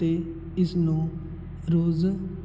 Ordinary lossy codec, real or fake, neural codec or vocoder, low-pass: none; real; none; none